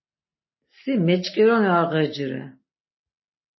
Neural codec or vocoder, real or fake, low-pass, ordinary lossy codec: none; real; 7.2 kHz; MP3, 24 kbps